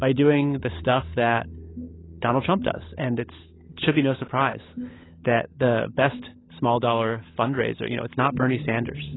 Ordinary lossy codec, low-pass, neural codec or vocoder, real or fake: AAC, 16 kbps; 7.2 kHz; codec, 16 kHz, 16 kbps, FunCodec, trained on LibriTTS, 50 frames a second; fake